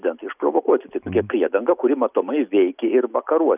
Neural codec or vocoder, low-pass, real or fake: none; 3.6 kHz; real